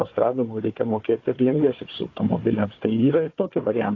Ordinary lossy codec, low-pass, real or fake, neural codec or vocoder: AAC, 32 kbps; 7.2 kHz; fake; codec, 16 kHz, 4 kbps, FunCodec, trained on Chinese and English, 50 frames a second